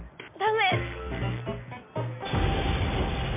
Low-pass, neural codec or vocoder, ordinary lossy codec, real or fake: 3.6 kHz; none; MP3, 32 kbps; real